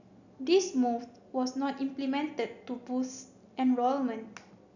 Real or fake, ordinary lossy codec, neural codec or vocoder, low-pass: real; none; none; 7.2 kHz